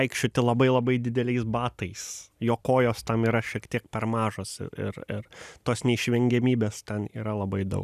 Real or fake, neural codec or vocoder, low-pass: real; none; 14.4 kHz